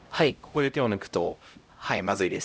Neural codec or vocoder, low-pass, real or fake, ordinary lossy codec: codec, 16 kHz, 0.5 kbps, X-Codec, HuBERT features, trained on LibriSpeech; none; fake; none